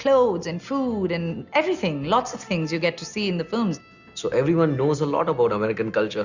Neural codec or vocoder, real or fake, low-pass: none; real; 7.2 kHz